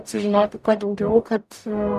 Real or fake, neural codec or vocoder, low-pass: fake; codec, 44.1 kHz, 0.9 kbps, DAC; 14.4 kHz